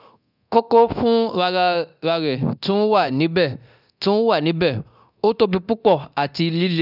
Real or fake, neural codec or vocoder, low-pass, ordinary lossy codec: fake; codec, 24 kHz, 0.9 kbps, DualCodec; 5.4 kHz; none